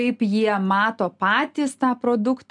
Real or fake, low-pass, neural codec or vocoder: real; 10.8 kHz; none